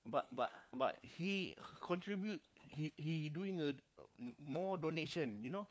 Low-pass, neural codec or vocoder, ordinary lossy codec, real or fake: none; codec, 16 kHz, 4 kbps, FunCodec, trained on LibriTTS, 50 frames a second; none; fake